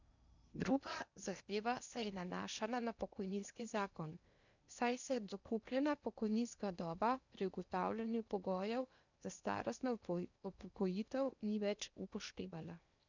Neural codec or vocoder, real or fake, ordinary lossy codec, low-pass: codec, 16 kHz in and 24 kHz out, 0.8 kbps, FocalCodec, streaming, 65536 codes; fake; none; 7.2 kHz